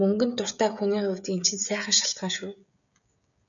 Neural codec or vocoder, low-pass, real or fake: codec, 16 kHz, 16 kbps, FreqCodec, smaller model; 7.2 kHz; fake